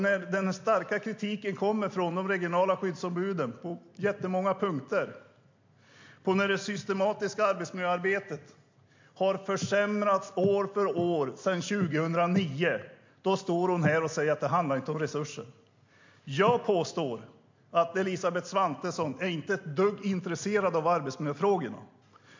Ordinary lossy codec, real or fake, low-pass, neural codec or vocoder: MP3, 48 kbps; real; 7.2 kHz; none